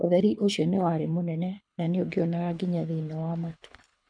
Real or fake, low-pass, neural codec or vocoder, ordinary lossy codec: fake; 9.9 kHz; codec, 24 kHz, 6 kbps, HILCodec; none